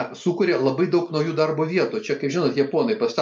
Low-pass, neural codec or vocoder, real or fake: 10.8 kHz; none; real